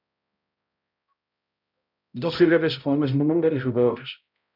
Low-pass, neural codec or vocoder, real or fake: 5.4 kHz; codec, 16 kHz, 0.5 kbps, X-Codec, HuBERT features, trained on balanced general audio; fake